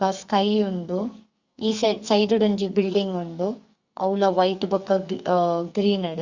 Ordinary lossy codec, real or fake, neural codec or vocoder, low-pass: Opus, 64 kbps; fake; codec, 44.1 kHz, 2.6 kbps, SNAC; 7.2 kHz